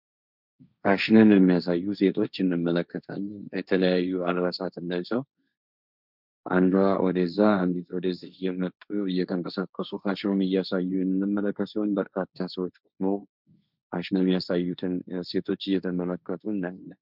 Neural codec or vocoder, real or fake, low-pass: codec, 16 kHz, 1.1 kbps, Voila-Tokenizer; fake; 5.4 kHz